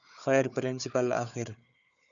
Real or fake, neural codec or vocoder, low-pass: fake; codec, 16 kHz, 4 kbps, FunCodec, trained on Chinese and English, 50 frames a second; 7.2 kHz